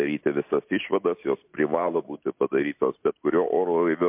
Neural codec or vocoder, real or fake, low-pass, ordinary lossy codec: none; real; 3.6 kHz; MP3, 32 kbps